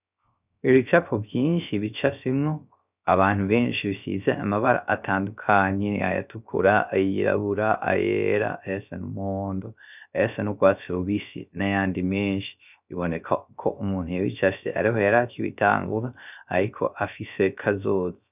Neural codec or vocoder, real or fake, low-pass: codec, 16 kHz, 0.3 kbps, FocalCodec; fake; 3.6 kHz